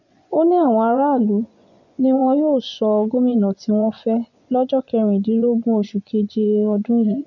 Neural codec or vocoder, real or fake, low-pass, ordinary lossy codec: vocoder, 24 kHz, 100 mel bands, Vocos; fake; 7.2 kHz; none